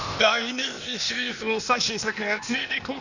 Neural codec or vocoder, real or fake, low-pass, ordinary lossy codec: codec, 16 kHz, 0.8 kbps, ZipCodec; fake; 7.2 kHz; none